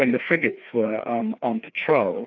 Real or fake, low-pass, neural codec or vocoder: fake; 7.2 kHz; codec, 16 kHz, 2 kbps, FreqCodec, larger model